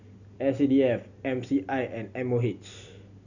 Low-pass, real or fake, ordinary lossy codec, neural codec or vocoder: 7.2 kHz; real; none; none